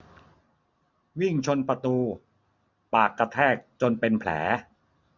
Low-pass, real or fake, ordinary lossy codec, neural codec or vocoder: 7.2 kHz; real; none; none